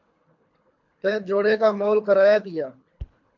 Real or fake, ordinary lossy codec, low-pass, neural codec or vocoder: fake; MP3, 48 kbps; 7.2 kHz; codec, 24 kHz, 3 kbps, HILCodec